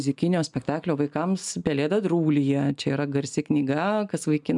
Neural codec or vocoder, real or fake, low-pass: none; real; 10.8 kHz